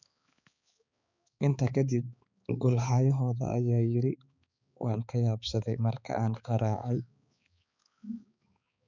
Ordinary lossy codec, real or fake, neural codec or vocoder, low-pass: none; fake; codec, 16 kHz, 4 kbps, X-Codec, HuBERT features, trained on balanced general audio; 7.2 kHz